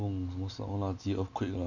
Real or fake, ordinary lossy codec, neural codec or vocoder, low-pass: real; none; none; 7.2 kHz